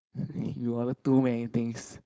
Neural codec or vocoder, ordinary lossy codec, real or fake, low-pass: codec, 16 kHz, 4.8 kbps, FACodec; none; fake; none